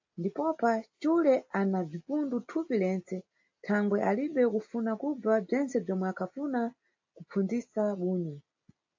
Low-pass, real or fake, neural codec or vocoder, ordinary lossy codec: 7.2 kHz; real; none; AAC, 48 kbps